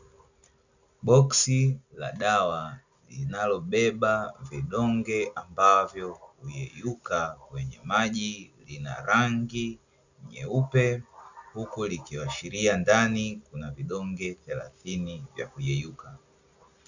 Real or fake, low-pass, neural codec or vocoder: real; 7.2 kHz; none